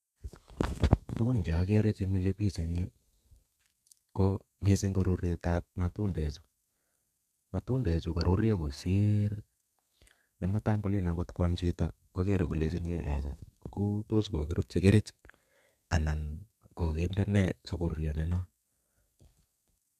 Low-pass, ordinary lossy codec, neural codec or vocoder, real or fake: 14.4 kHz; none; codec, 32 kHz, 1.9 kbps, SNAC; fake